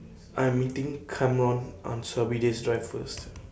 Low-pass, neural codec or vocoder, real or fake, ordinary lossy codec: none; none; real; none